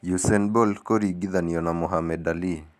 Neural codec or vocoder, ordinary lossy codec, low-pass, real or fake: none; none; 14.4 kHz; real